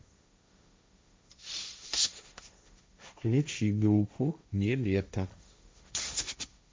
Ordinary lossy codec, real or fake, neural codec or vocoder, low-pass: none; fake; codec, 16 kHz, 1.1 kbps, Voila-Tokenizer; none